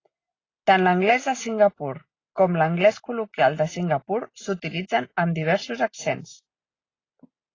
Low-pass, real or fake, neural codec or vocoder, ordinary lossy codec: 7.2 kHz; real; none; AAC, 32 kbps